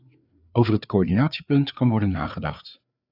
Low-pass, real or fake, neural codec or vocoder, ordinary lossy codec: 5.4 kHz; fake; codec, 16 kHz, 4 kbps, FreqCodec, larger model; AAC, 48 kbps